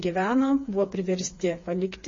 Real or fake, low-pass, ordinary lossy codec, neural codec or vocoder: fake; 7.2 kHz; MP3, 32 kbps; codec, 16 kHz, 4 kbps, FreqCodec, smaller model